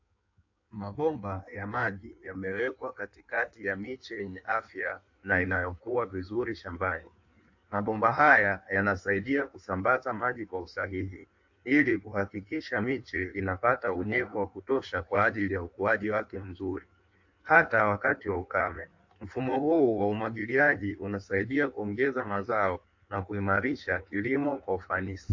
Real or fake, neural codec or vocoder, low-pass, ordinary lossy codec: fake; codec, 16 kHz in and 24 kHz out, 1.1 kbps, FireRedTTS-2 codec; 7.2 kHz; AAC, 48 kbps